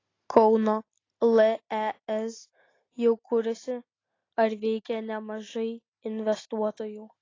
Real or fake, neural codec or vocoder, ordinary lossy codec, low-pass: real; none; AAC, 32 kbps; 7.2 kHz